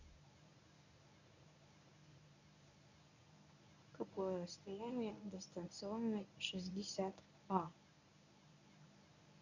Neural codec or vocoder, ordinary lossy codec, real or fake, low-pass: codec, 24 kHz, 0.9 kbps, WavTokenizer, medium speech release version 1; none; fake; 7.2 kHz